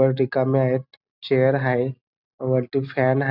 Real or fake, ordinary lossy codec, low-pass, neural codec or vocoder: real; none; 5.4 kHz; none